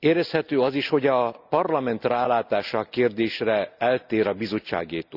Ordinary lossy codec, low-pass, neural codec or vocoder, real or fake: none; 5.4 kHz; none; real